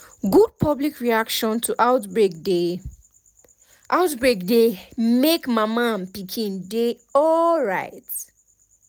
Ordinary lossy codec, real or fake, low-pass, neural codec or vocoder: none; real; none; none